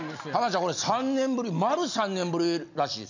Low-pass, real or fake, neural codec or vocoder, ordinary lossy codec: 7.2 kHz; real; none; none